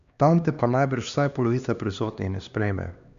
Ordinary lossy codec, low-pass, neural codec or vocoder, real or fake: Opus, 64 kbps; 7.2 kHz; codec, 16 kHz, 2 kbps, X-Codec, HuBERT features, trained on LibriSpeech; fake